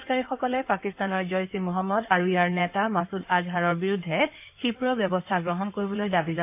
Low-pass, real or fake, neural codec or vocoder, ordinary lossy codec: 3.6 kHz; fake; codec, 16 kHz in and 24 kHz out, 2.2 kbps, FireRedTTS-2 codec; AAC, 32 kbps